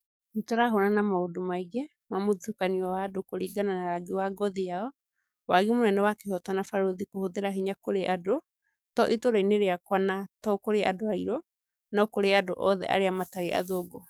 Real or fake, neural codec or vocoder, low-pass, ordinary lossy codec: fake; codec, 44.1 kHz, 7.8 kbps, DAC; none; none